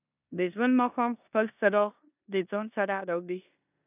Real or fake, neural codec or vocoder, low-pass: fake; codec, 16 kHz in and 24 kHz out, 0.9 kbps, LongCat-Audio-Codec, four codebook decoder; 3.6 kHz